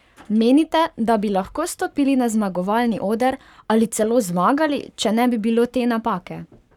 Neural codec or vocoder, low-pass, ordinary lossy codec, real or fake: codec, 44.1 kHz, 7.8 kbps, Pupu-Codec; 19.8 kHz; none; fake